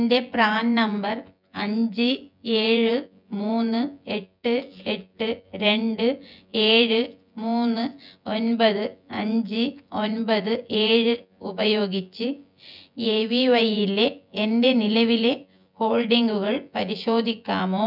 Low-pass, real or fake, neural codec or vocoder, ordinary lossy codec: 5.4 kHz; fake; vocoder, 24 kHz, 100 mel bands, Vocos; none